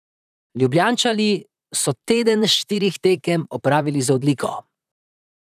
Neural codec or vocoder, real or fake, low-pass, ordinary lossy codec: vocoder, 44.1 kHz, 128 mel bands, Pupu-Vocoder; fake; 14.4 kHz; none